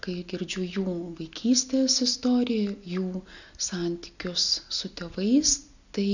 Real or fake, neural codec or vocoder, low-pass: real; none; 7.2 kHz